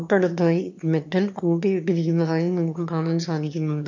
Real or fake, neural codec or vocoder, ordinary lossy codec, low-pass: fake; autoencoder, 22.05 kHz, a latent of 192 numbers a frame, VITS, trained on one speaker; MP3, 48 kbps; 7.2 kHz